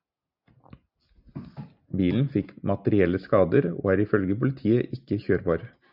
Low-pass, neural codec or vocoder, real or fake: 5.4 kHz; none; real